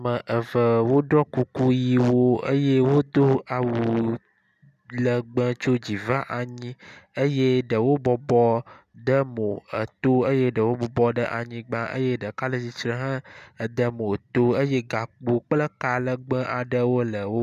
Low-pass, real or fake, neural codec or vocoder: 14.4 kHz; real; none